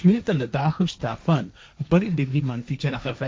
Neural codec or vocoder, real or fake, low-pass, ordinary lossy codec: codec, 16 kHz, 1.1 kbps, Voila-Tokenizer; fake; none; none